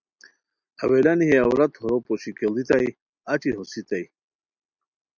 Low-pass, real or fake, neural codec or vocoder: 7.2 kHz; real; none